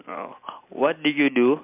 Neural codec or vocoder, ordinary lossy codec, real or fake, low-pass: none; MP3, 32 kbps; real; 3.6 kHz